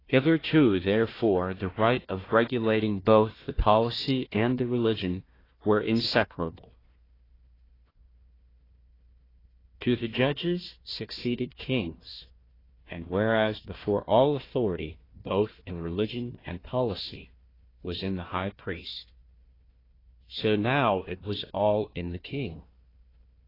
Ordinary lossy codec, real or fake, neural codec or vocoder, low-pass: AAC, 24 kbps; fake; codec, 16 kHz, 1 kbps, FunCodec, trained on Chinese and English, 50 frames a second; 5.4 kHz